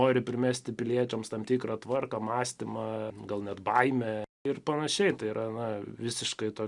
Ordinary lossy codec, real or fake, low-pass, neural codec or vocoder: Opus, 64 kbps; real; 10.8 kHz; none